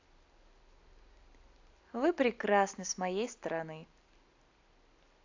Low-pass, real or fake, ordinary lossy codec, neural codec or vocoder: 7.2 kHz; real; AAC, 48 kbps; none